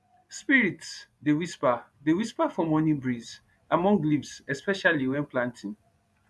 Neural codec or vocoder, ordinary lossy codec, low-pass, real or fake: vocoder, 24 kHz, 100 mel bands, Vocos; none; none; fake